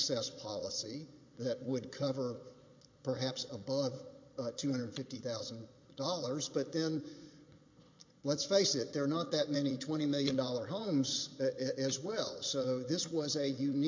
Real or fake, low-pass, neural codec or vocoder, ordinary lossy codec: fake; 7.2 kHz; vocoder, 22.05 kHz, 80 mel bands, Vocos; MP3, 48 kbps